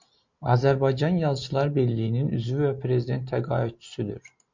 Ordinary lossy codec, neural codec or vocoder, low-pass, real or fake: MP3, 48 kbps; none; 7.2 kHz; real